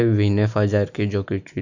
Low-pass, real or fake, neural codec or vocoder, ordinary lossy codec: 7.2 kHz; real; none; none